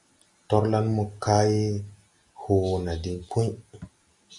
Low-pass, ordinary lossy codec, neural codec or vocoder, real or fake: 10.8 kHz; AAC, 64 kbps; none; real